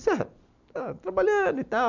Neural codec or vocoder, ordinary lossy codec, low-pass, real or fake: none; none; 7.2 kHz; real